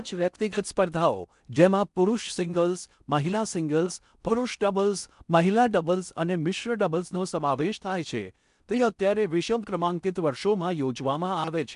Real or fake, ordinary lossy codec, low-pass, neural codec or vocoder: fake; none; 10.8 kHz; codec, 16 kHz in and 24 kHz out, 0.6 kbps, FocalCodec, streaming, 4096 codes